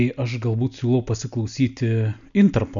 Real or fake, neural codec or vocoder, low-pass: real; none; 7.2 kHz